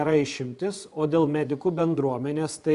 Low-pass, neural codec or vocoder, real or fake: 10.8 kHz; vocoder, 24 kHz, 100 mel bands, Vocos; fake